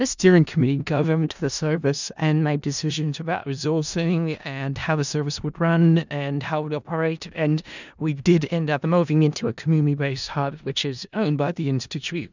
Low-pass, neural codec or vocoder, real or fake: 7.2 kHz; codec, 16 kHz in and 24 kHz out, 0.4 kbps, LongCat-Audio-Codec, four codebook decoder; fake